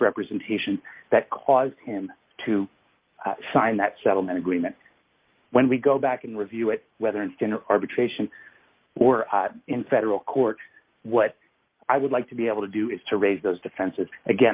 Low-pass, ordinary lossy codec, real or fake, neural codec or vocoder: 3.6 kHz; Opus, 24 kbps; real; none